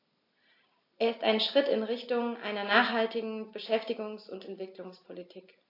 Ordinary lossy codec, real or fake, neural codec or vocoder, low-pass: AAC, 32 kbps; real; none; 5.4 kHz